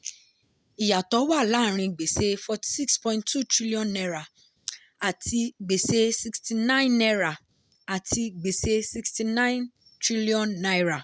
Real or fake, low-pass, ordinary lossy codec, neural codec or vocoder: real; none; none; none